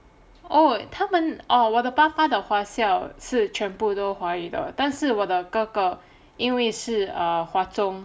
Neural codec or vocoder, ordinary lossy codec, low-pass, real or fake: none; none; none; real